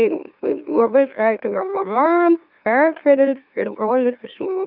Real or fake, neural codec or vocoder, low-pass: fake; autoencoder, 44.1 kHz, a latent of 192 numbers a frame, MeloTTS; 5.4 kHz